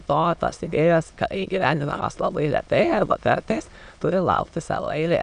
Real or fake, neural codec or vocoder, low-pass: fake; autoencoder, 22.05 kHz, a latent of 192 numbers a frame, VITS, trained on many speakers; 9.9 kHz